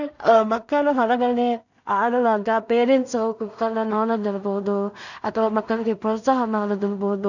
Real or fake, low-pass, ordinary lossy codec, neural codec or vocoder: fake; 7.2 kHz; none; codec, 16 kHz in and 24 kHz out, 0.4 kbps, LongCat-Audio-Codec, two codebook decoder